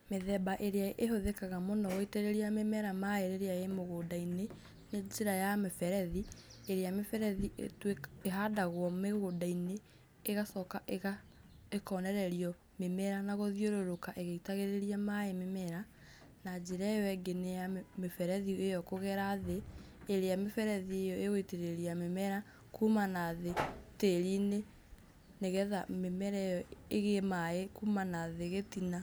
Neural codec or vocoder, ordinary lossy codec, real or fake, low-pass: none; none; real; none